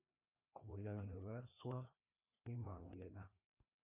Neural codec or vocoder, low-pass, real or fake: codec, 16 kHz, 2 kbps, FreqCodec, larger model; 3.6 kHz; fake